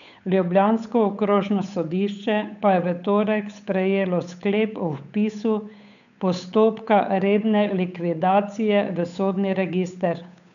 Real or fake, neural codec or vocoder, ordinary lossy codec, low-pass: fake; codec, 16 kHz, 8 kbps, FunCodec, trained on Chinese and English, 25 frames a second; none; 7.2 kHz